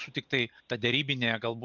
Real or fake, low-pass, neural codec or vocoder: real; 7.2 kHz; none